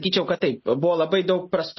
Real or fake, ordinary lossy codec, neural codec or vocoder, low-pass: real; MP3, 24 kbps; none; 7.2 kHz